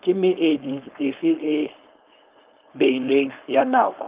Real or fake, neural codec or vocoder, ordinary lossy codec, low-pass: fake; codec, 16 kHz, 4.8 kbps, FACodec; Opus, 32 kbps; 3.6 kHz